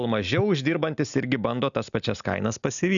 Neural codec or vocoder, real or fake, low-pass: none; real; 7.2 kHz